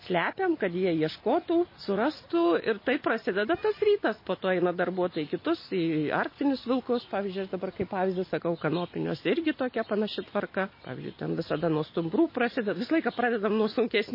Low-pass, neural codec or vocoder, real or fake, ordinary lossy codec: 5.4 kHz; none; real; MP3, 24 kbps